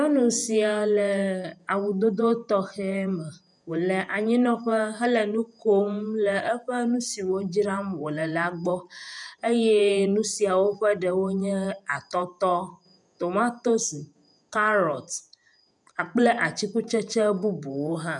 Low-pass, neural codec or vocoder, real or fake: 10.8 kHz; vocoder, 48 kHz, 128 mel bands, Vocos; fake